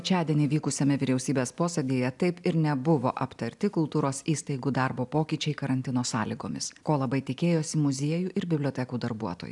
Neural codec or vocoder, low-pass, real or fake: none; 10.8 kHz; real